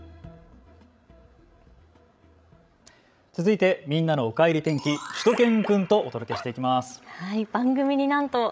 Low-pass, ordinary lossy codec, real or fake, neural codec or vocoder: none; none; fake; codec, 16 kHz, 16 kbps, FreqCodec, larger model